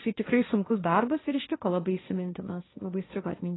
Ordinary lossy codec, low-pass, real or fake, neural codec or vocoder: AAC, 16 kbps; 7.2 kHz; fake; codec, 16 kHz, 1.1 kbps, Voila-Tokenizer